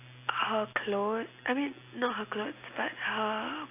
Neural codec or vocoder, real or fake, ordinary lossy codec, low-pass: none; real; AAC, 24 kbps; 3.6 kHz